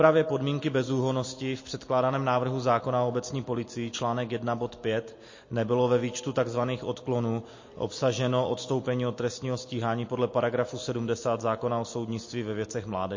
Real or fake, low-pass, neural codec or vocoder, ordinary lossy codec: real; 7.2 kHz; none; MP3, 32 kbps